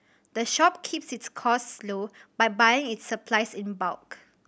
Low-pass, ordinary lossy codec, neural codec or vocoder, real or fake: none; none; none; real